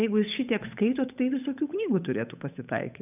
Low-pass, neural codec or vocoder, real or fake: 3.6 kHz; codec, 16 kHz, 8 kbps, FunCodec, trained on Chinese and English, 25 frames a second; fake